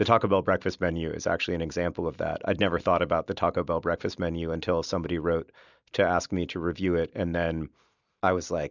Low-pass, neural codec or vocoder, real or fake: 7.2 kHz; none; real